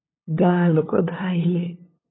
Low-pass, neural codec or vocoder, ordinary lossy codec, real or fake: 7.2 kHz; codec, 16 kHz, 2 kbps, FunCodec, trained on LibriTTS, 25 frames a second; AAC, 16 kbps; fake